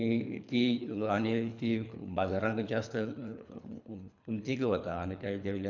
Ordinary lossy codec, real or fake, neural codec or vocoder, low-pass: none; fake; codec, 24 kHz, 3 kbps, HILCodec; 7.2 kHz